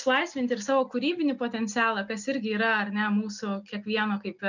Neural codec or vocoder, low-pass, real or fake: none; 7.2 kHz; real